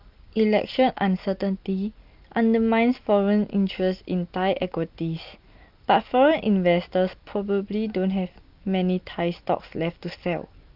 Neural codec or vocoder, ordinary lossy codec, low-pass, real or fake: none; Opus, 32 kbps; 5.4 kHz; real